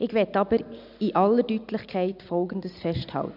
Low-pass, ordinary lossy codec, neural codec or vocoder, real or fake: 5.4 kHz; none; none; real